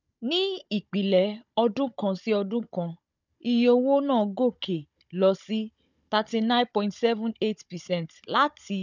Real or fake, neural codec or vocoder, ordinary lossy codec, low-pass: fake; codec, 16 kHz, 16 kbps, FunCodec, trained on Chinese and English, 50 frames a second; none; 7.2 kHz